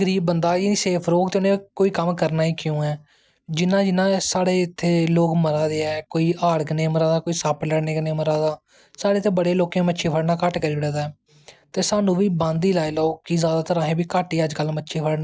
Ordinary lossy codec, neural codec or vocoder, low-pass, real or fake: none; none; none; real